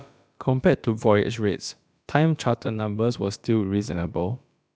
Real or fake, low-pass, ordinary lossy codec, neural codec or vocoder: fake; none; none; codec, 16 kHz, about 1 kbps, DyCAST, with the encoder's durations